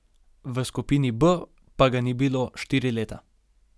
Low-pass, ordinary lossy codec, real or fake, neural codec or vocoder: none; none; real; none